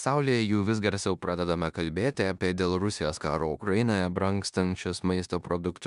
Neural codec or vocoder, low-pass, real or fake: codec, 16 kHz in and 24 kHz out, 0.9 kbps, LongCat-Audio-Codec, four codebook decoder; 10.8 kHz; fake